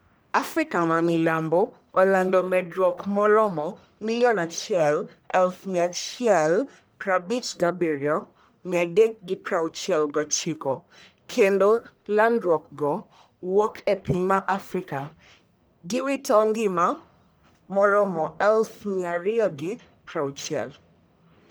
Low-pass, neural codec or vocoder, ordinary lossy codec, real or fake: none; codec, 44.1 kHz, 1.7 kbps, Pupu-Codec; none; fake